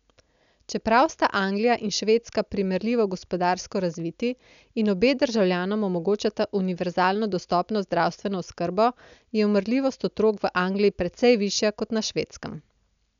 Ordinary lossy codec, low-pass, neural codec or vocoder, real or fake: none; 7.2 kHz; none; real